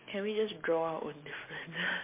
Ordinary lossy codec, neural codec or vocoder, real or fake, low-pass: MP3, 24 kbps; none; real; 3.6 kHz